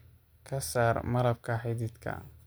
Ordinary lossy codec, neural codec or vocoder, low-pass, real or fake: none; none; none; real